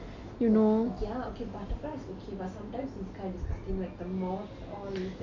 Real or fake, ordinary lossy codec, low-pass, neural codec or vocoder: real; AAC, 48 kbps; 7.2 kHz; none